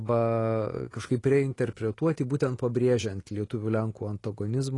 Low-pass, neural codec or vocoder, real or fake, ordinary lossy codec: 10.8 kHz; none; real; AAC, 32 kbps